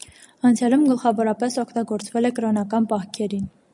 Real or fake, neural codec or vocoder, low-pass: real; none; 10.8 kHz